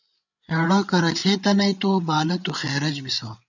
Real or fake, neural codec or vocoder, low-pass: fake; codec, 16 kHz, 8 kbps, FreqCodec, larger model; 7.2 kHz